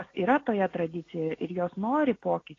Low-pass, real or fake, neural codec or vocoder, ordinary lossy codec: 7.2 kHz; real; none; AAC, 32 kbps